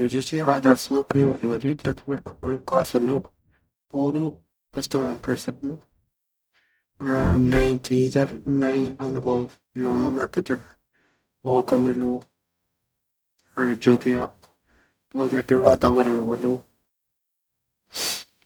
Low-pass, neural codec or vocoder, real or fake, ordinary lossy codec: none; codec, 44.1 kHz, 0.9 kbps, DAC; fake; none